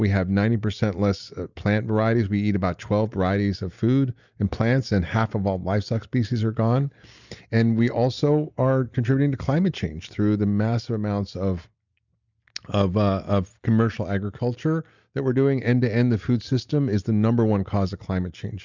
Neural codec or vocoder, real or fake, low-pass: none; real; 7.2 kHz